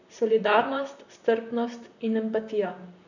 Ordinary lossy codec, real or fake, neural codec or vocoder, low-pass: none; fake; vocoder, 44.1 kHz, 128 mel bands, Pupu-Vocoder; 7.2 kHz